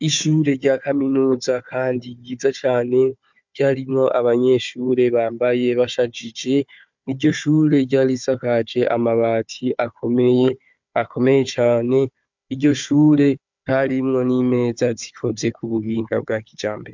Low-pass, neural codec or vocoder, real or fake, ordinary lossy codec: 7.2 kHz; codec, 16 kHz, 4 kbps, FunCodec, trained on Chinese and English, 50 frames a second; fake; MP3, 64 kbps